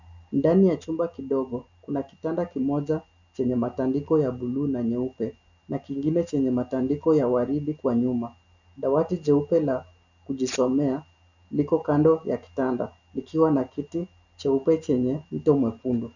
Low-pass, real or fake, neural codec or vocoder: 7.2 kHz; real; none